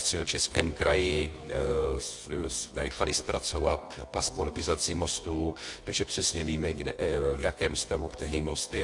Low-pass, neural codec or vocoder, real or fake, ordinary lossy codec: 10.8 kHz; codec, 24 kHz, 0.9 kbps, WavTokenizer, medium music audio release; fake; AAC, 64 kbps